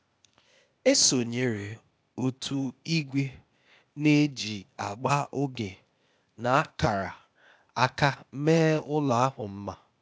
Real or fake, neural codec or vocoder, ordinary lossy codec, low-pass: fake; codec, 16 kHz, 0.8 kbps, ZipCodec; none; none